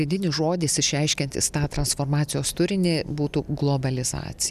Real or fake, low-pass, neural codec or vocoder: real; 14.4 kHz; none